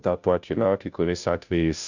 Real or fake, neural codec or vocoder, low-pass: fake; codec, 16 kHz, 0.5 kbps, FunCodec, trained on Chinese and English, 25 frames a second; 7.2 kHz